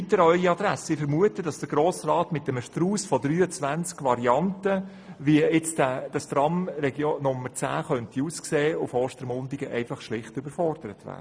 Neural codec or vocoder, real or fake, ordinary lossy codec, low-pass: none; real; none; none